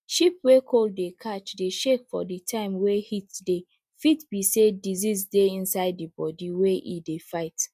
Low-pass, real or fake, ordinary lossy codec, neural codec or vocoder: 14.4 kHz; real; MP3, 96 kbps; none